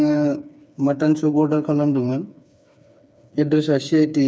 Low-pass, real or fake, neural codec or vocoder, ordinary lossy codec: none; fake; codec, 16 kHz, 4 kbps, FreqCodec, smaller model; none